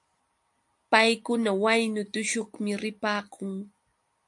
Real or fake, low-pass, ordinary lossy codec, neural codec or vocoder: real; 10.8 kHz; AAC, 64 kbps; none